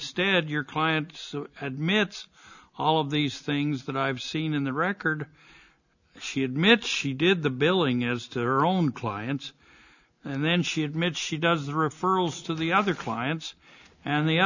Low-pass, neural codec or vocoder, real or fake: 7.2 kHz; none; real